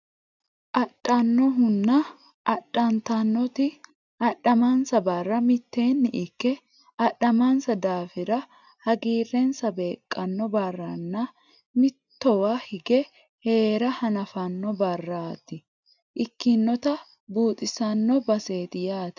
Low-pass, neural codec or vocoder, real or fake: 7.2 kHz; none; real